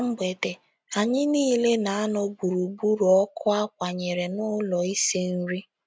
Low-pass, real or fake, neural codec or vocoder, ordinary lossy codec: none; real; none; none